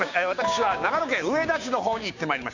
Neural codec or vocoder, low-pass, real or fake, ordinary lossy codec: codec, 16 kHz, 6 kbps, DAC; 7.2 kHz; fake; none